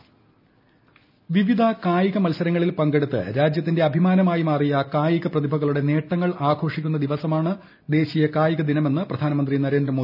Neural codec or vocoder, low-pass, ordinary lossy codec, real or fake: none; 5.4 kHz; none; real